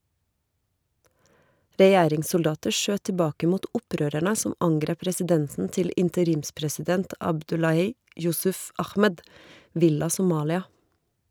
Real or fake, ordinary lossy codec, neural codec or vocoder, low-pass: real; none; none; none